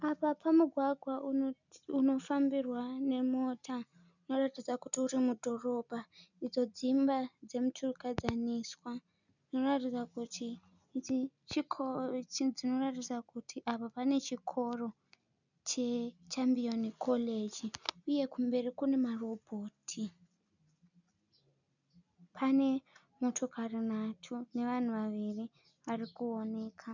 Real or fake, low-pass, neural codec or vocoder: real; 7.2 kHz; none